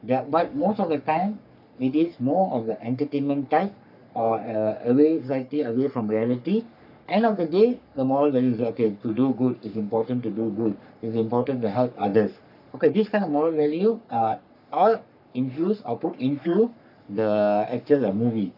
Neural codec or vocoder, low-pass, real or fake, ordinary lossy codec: codec, 44.1 kHz, 3.4 kbps, Pupu-Codec; 5.4 kHz; fake; none